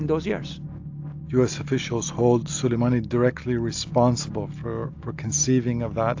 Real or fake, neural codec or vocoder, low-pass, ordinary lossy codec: real; none; 7.2 kHz; AAC, 48 kbps